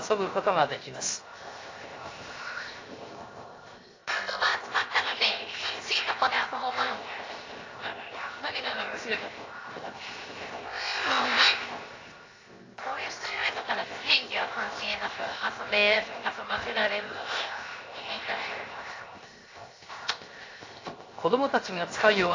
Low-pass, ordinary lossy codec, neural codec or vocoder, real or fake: 7.2 kHz; AAC, 32 kbps; codec, 16 kHz, 0.7 kbps, FocalCodec; fake